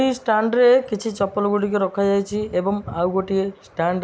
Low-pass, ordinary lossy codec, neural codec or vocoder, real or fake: none; none; none; real